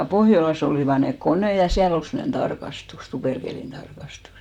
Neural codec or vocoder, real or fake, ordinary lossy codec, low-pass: vocoder, 44.1 kHz, 128 mel bands, Pupu-Vocoder; fake; none; 19.8 kHz